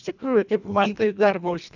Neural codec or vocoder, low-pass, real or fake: codec, 24 kHz, 1.5 kbps, HILCodec; 7.2 kHz; fake